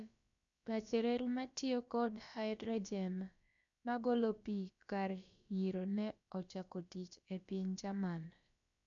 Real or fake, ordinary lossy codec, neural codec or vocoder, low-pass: fake; MP3, 96 kbps; codec, 16 kHz, about 1 kbps, DyCAST, with the encoder's durations; 7.2 kHz